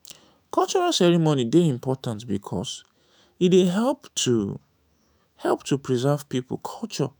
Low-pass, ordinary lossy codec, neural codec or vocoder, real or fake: none; none; autoencoder, 48 kHz, 128 numbers a frame, DAC-VAE, trained on Japanese speech; fake